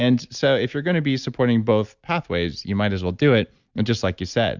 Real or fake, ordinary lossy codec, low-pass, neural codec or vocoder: real; Opus, 64 kbps; 7.2 kHz; none